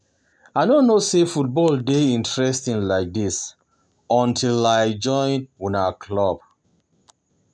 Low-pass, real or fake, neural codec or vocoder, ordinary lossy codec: 9.9 kHz; real; none; none